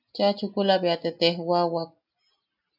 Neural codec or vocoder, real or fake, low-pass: none; real; 5.4 kHz